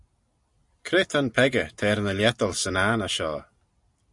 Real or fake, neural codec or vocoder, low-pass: real; none; 10.8 kHz